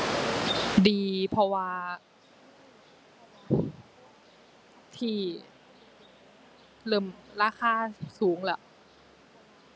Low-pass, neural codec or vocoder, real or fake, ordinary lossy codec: none; none; real; none